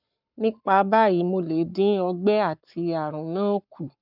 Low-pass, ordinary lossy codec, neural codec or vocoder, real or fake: 5.4 kHz; none; codec, 44.1 kHz, 7.8 kbps, Pupu-Codec; fake